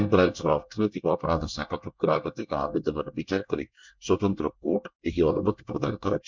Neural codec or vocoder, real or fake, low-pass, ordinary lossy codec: codec, 24 kHz, 1 kbps, SNAC; fake; 7.2 kHz; MP3, 64 kbps